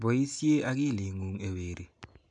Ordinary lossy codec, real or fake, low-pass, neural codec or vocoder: AAC, 64 kbps; real; 9.9 kHz; none